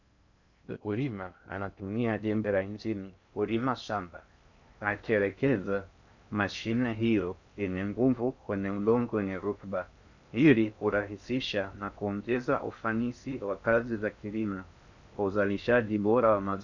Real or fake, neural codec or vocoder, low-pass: fake; codec, 16 kHz in and 24 kHz out, 0.6 kbps, FocalCodec, streaming, 2048 codes; 7.2 kHz